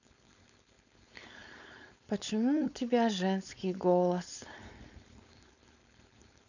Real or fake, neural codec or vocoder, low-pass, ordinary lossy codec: fake; codec, 16 kHz, 4.8 kbps, FACodec; 7.2 kHz; none